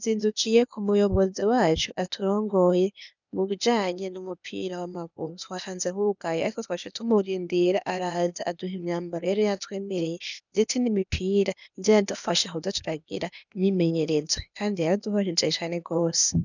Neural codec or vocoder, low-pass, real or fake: codec, 16 kHz, 0.8 kbps, ZipCodec; 7.2 kHz; fake